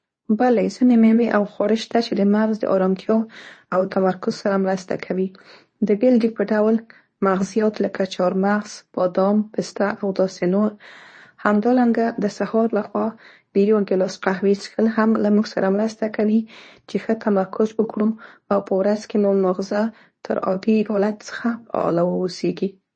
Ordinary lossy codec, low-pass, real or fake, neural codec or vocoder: MP3, 32 kbps; 9.9 kHz; fake; codec, 24 kHz, 0.9 kbps, WavTokenizer, medium speech release version 2